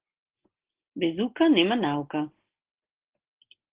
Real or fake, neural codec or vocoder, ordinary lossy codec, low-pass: real; none; Opus, 16 kbps; 3.6 kHz